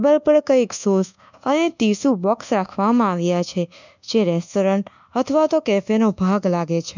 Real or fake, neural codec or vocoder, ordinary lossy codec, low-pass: fake; codec, 24 kHz, 1.2 kbps, DualCodec; none; 7.2 kHz